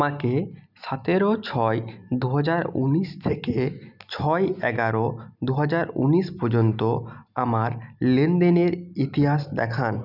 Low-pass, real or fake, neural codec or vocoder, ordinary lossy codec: 5.4 kHz; real; none; none